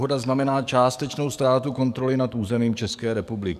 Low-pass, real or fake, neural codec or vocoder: 14.4 kHz; fake; codec, 44.1 kHz, 7.8 kbps, DAC